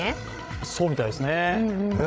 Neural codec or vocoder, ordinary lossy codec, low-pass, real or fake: codec, 16 kHz, 16 kbps, FreqCodec, larger model; none; none; fake